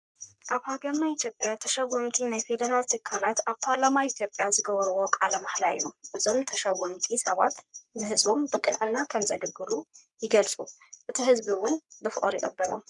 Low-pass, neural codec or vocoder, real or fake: 10.8 kHz; codec, 44.1 kHz, 3.4 kbps, Pupu-Codec; fake